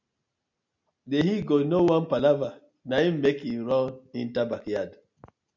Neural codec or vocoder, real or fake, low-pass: none; real; 7.2 kHz